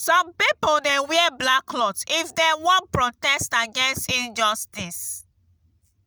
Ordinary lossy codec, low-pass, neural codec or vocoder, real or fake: none; none; none; real